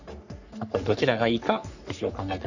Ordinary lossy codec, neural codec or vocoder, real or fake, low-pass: none; codec, 44.1 kHz, 3.4 kbps, Pupu-Codec; fake; 7.2 kHz